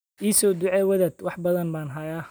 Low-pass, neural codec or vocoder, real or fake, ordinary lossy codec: none; none; real; none